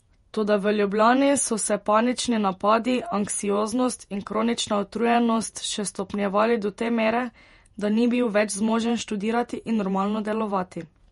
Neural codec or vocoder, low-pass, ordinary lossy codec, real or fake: vocoder, 48 kHz, 128 mel bands, Vocos; 19.8 kHz; MP3, 48 kbps; fake